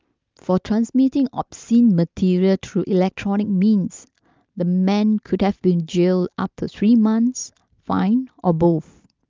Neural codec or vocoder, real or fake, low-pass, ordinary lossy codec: none; real; 7.2 kHz; Opus, 24 kbps